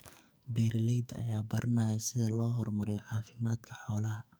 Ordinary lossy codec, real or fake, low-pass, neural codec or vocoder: none; fake; none; codec, 44.1 kHz, 2.6 kbps, SNAC